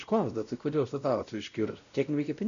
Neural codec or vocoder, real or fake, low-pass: codec, 16 kHz, 0.5 kbps, X-Codec, WavLM features, trained on Multilingual LibriSpeech; fake; 7.2 kHz